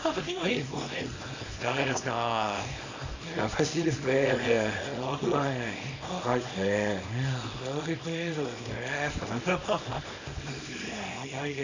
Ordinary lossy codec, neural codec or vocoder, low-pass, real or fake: AAC, 48 kbps; codec, 24 kHz, 0.9 kbps, WavTokenizer, small release; 7.2 kHz; fake